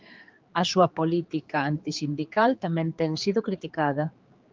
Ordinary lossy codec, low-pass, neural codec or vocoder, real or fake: Opus, 24 kbps; 7.2 kHz; codec, 16 kHz, 4 kbps, X-Codec, HuBERT features, trained on general audio; fake